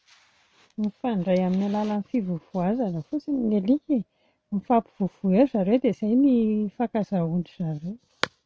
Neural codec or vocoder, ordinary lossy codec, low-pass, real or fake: none; none; none; real